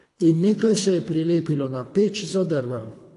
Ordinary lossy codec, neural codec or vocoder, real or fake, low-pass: AAC, 48 kbps; codec, 24 kHz, 3 kbps, HILCodec; fake; 10.8 kHz